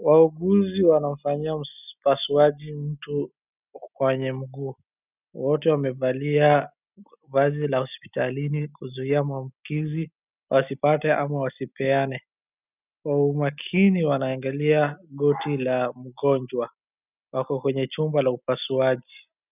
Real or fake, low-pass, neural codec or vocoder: real; 3.6 kHz; none